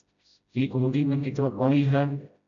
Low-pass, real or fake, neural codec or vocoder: 7.2 kHz; fake; codec, 16 kHz, 0.5 kbps, FreqCodec, smaller model